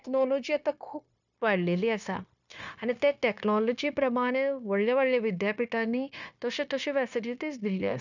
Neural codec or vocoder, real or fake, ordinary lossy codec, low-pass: codec, 16 kHz, 0.9 kbps, LongCat-Audio-Codec; fake; none; 7.2 kHz